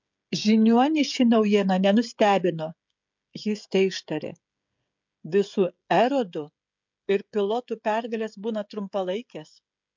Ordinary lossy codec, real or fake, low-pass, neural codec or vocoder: MP3, 64 kbps; fake; 7.2 kHz; codec, 16 kHz, 16 kbps, FreqCodec, smaller model